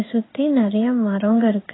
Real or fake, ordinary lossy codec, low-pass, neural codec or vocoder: fake; AAC, 16 kbps; 7.2 kHz; codec, 16 kHz in and 24 kHz out, 1 kbps, XY-Tokenizer